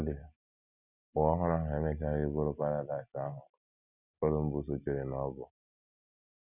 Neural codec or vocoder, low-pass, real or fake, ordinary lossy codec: none; 3.6 kHz; real; none